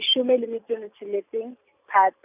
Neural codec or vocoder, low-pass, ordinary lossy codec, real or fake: none; 3.6 kHz; none; real